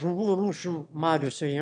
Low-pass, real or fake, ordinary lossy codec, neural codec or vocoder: 9.9 kHz; fake; MP3, 96 kbps; autoencoder, 22.05 kHz, a latent of 192 numbers a frame, VITS, trained on one speaker